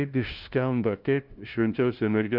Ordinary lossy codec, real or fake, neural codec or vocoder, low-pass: Opus, 24 kbps; fake; codec, 16 kHz, 0.5 kbps, FunCodec, trained on LibriTTS, 25 frames a second; 5.4 kHz